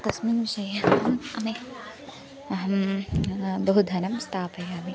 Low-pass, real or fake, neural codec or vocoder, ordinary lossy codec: none; real; none; none